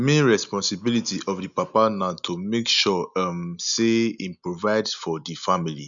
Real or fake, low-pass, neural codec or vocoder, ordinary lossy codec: real; 7.2 kHz; none; none